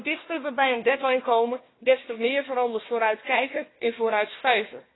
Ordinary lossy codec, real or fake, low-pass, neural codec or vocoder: AAC, 16 kbps; fake; 7.2 kHz; codec, 16 kHz, 1 kbps, FunCodec, trained on Chinese and English, 50 frames a second